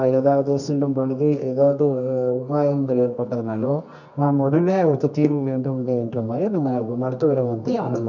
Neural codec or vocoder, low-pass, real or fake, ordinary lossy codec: codec, 24 kHz, 0.9 kbps, WavTokenizer, medium music audio release; 7.2 kHz; fake; none